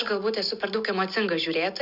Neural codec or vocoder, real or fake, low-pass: none; real; 5.4 kHz